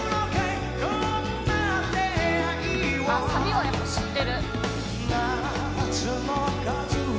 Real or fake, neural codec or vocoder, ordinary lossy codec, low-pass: real; none; none; none